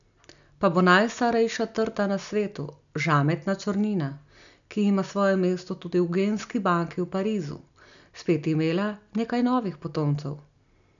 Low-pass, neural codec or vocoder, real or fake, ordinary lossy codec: 7.2 kHz; none; real; none